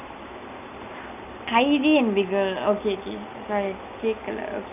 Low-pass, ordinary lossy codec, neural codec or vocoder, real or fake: 3.6 kHz; none; none; real